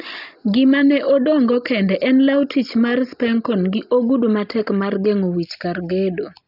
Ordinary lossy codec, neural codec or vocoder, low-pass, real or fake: none; none; 5.4 kHz; real